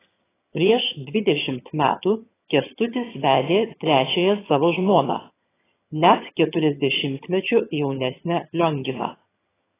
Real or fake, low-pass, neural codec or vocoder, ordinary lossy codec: fake; 3.6 kHz; vocoder, 22.05 kHz, 80 mel bands, HiFi-GAN; AAC, 16 kbps